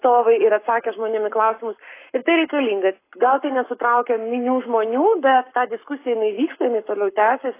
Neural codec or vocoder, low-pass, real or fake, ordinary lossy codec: codec, 44.1 kHz, 7.8 kbps, Pupu-Codec; 3.6 kHz; fake; AAC, 24 kbps